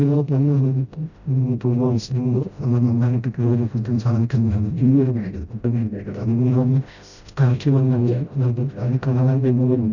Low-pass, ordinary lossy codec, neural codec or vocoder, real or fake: 7.2 kHz; none; codec, 16 kHz, 0.5 kbps, FreqCodec, smaller model; fake